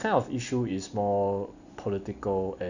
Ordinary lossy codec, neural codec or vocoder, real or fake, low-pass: none; none; real; 7.2 kHz